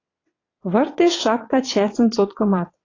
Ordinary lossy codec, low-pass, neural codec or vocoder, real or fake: AAC, 32 kbps; 7.2 kHz; vocoder, 22.05 kHz, 80 mel bands, WaveNeXt; fake